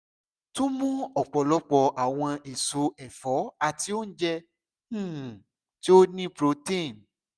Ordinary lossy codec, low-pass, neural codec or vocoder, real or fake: none; none; none; real